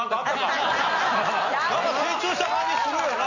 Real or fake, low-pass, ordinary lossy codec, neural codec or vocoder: real; 7.2 kHz; none; none